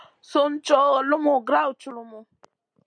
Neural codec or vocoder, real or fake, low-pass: none; real; 9.9 kHz